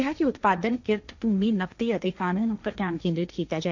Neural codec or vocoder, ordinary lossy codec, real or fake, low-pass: codec, 16 kHz, 1.1 kbps, Voila-Tokenizer; none; fake; 7.2 kHz